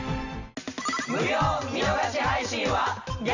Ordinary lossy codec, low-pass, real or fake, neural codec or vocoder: none; 7.2 kHz; real; none